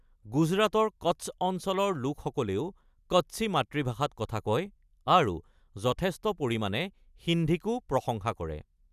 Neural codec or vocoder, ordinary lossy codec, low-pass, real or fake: none; none; 14.4 kHz; real